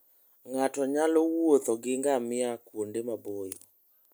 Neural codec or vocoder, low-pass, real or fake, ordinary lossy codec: none; none; real; none